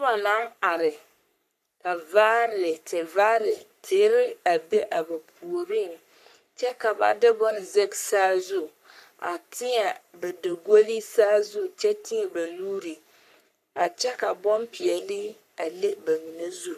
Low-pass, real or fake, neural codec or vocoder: 14.4 kHz; fake; codec, 44.1 kHz, 3.4 kbps, Pupu-Codec